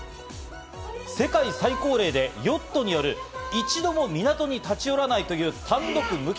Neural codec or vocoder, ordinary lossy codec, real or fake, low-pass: none; none; real; none